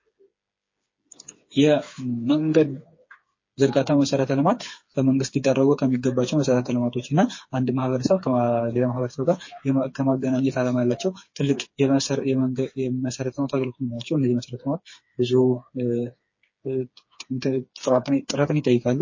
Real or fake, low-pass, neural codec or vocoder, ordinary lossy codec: fake; 7.2 kHz; codec, 16 kHz, 4 kbps, FreqCodec, smaller model; MP3, 32 kbps